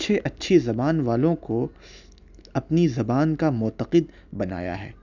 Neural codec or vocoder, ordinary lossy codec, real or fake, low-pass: none; none; real; 7.2 kHz